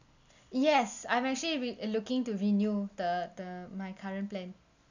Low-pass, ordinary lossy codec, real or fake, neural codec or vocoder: 7.2 kHz; none; real; none